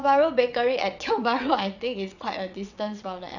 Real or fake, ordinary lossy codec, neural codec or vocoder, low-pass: fake; none; vocoder, 22.05 kHz, 80 mel bands, WaveNeXt; 7.2 kHz